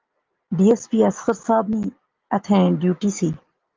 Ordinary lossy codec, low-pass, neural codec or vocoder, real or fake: Opus, 32 kbps; 7.2 kHz; none; real